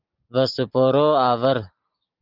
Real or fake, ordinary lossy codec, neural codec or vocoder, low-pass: real; Opus, 24 kbps; none; 5.4 kHz